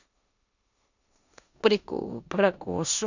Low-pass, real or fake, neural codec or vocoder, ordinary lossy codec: 7.2 kHz; fake; codec, 16 kHz in and 24 kHz out, 0.9 kbps, LongCat-Audio-Codec, four codebook decoder; none